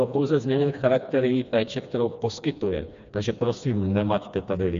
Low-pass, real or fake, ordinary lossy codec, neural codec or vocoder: 7.2 kHz; fake; MP3, 64 kbps; codec, 16 kHz, 2 kbps, FreqCodec, smaller model